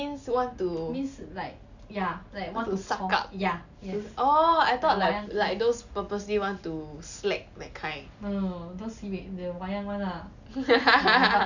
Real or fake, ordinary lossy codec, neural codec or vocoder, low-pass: real; none; none; 7.2 kHz